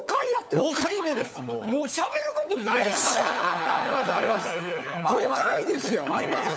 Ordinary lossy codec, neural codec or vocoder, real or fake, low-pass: none; codec, 16 kHz, 4 kbps, FunCodec, trained on LibriTTS, 50 frames a second; fake; none